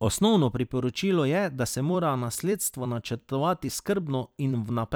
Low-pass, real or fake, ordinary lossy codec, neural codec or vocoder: none; fake; none; vocoder, 44.1 kHz, 128 mel bands every 256 samples, BigVGAN v2